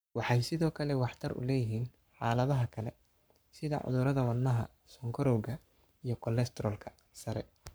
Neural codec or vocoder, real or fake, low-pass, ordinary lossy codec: codec, 44.1 kHz, 7.8 kbps, Pupu-Codec; fake; none; none